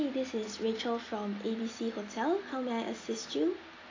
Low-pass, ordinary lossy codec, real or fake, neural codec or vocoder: 7.2 kHz; AAC, 32 kbps; real; none